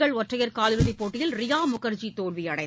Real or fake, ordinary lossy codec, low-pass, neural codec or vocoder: real; none; none; none